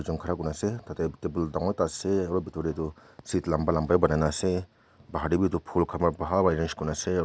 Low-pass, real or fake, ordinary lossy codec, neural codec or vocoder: none; real; none; none